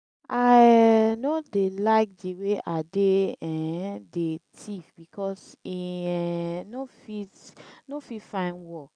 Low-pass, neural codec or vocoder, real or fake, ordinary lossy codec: 9.9 kHz; none; real; none